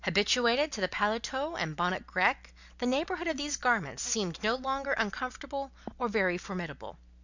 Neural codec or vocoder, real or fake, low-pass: none; real; 7.2 kHz